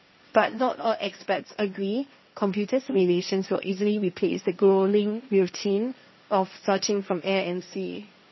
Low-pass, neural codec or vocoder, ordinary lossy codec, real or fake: 7.2 kHz; codec, 16 kHz, 1.1 kbps, Voila-Tokenizer; MP3, 24 kbps; fake